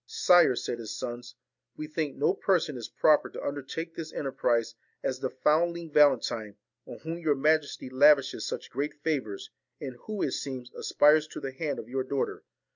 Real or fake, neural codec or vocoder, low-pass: real; none; 7.2 kHz